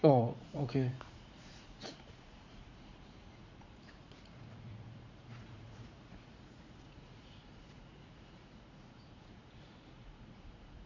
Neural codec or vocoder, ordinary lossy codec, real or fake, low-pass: vocoder, 44.1 kHz, 80 mel bands, Vocos; MP3, 64 kbps; fake; 7.2 kHz